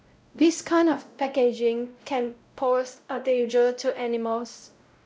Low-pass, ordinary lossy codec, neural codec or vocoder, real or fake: none; none; codec, 16 kHz, 0.5 kbps, X-Codec, WavLM features, trained on Multilingual LibriSpeech; fake